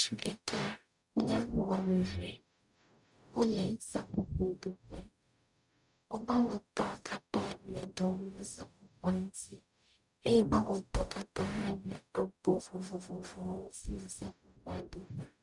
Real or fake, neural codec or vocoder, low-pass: fake; codec, 44.1 kHz, 0.9 kbps, DAC; 10.8 kHz